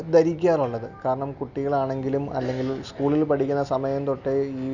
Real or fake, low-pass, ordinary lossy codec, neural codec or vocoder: real; 7.2 kHz; none; none